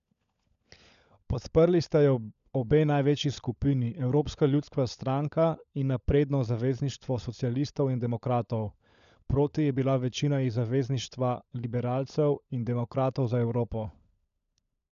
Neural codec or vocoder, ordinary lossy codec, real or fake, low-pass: codec, 16 kHz, 16 kbps, FunCodec, trained on LibriTTS, 50 frames a second; none; fake; 7.2 kHz